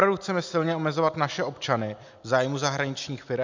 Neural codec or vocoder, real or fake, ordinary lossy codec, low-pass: none; real; MP3, 64 kbps; 7.2 kHz